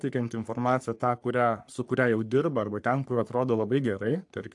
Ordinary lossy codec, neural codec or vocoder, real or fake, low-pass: AAC, 64 kbps; codec, 44.1 kHz, 3.4 kbps, Pupu-Codec; fake; 10.8 kHz